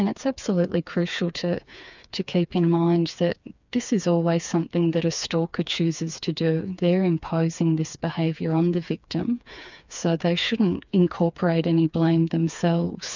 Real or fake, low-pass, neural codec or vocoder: fake; 7.2 kHz; codec, 16 kHz, 4 kbps, FreqCodec, smaller model